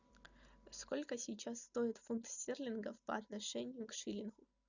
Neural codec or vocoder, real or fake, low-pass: none; real; 7.2 kHz